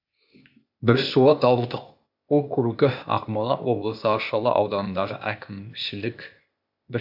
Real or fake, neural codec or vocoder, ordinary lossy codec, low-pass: fake; codec, 16 kHz, 0.8 kbps, ZipCodec; AAC, 48 kbps; 5.4 kHz